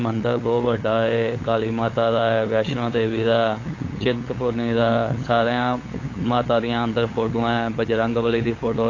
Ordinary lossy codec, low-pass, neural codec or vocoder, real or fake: AAC, 32 kbps; 7.2 kHz; codec, 16 kHz, 8 kbps, FunCodec, trained on LibriTTS, 25 frames a second; fake